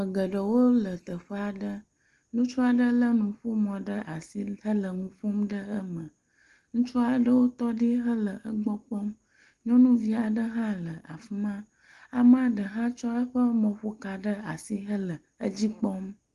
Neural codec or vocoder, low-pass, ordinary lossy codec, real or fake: none; 10.8 kHz; Opus, 24 kbps; real